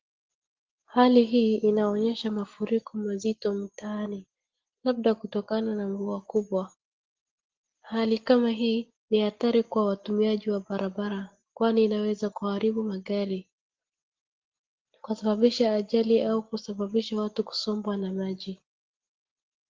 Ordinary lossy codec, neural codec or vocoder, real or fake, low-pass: Opus, 16 kbps; none; real; 7.2 kHz